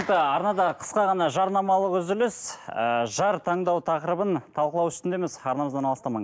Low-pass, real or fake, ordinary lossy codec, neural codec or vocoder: none; real; none; none